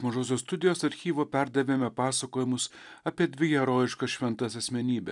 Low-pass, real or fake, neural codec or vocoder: 10.8 kHz; real; none